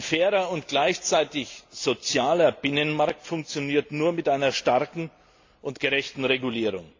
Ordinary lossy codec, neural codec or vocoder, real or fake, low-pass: AAC, 48 kbps; none; real; 7.2 kHz